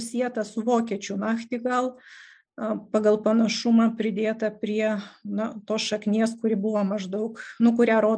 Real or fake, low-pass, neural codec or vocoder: real; 9.9 kHz; none